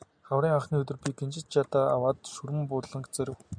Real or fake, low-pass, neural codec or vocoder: real; 9.9 kHz; none